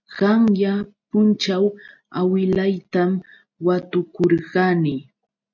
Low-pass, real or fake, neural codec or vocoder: 7.2 kHz; real; none